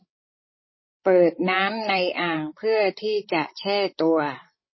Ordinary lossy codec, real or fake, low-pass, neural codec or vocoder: MP3, 24 kbps; fake; 7.2 kHz; codec, 16 kHz, 16 kbps, FreqCodec, larger model